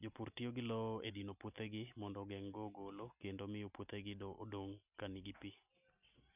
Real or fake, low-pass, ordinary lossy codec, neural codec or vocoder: real; 3.6 kHz; none; none